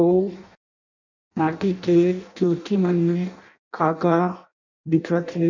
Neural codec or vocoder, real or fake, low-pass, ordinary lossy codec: codec, 16 kHz in and 24 kHz out, 0.6 kbps, FireRedTTS-2 codec; fake; 7.2 kHz; none